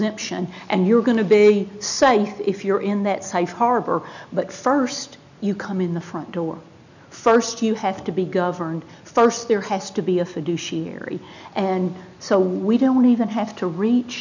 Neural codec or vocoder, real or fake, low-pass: none; real; 7.2 kHz